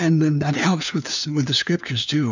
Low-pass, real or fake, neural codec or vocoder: 7.2 kHz; fake; codec, 16 kHz, 4 kbps, FunCodec, trained on LibriTTS, 50 frames a second